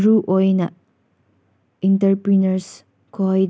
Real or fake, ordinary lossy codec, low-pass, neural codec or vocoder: real; none; none; none